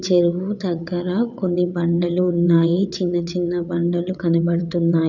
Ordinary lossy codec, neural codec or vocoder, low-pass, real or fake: none; vocoder, 22.05 kHz, 80 mel bands, WaveNeXt; 7.2 kHz; fake